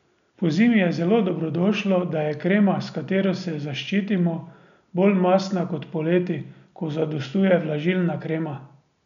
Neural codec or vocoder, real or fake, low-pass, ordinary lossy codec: none; real; 7.2 kHz; none